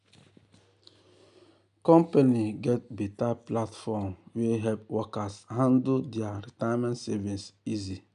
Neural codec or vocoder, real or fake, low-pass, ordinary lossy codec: none; real; 10.8 kHz; none